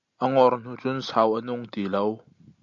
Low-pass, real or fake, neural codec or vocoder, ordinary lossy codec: 7.2 kHz; real; none; AAC, 64 kbps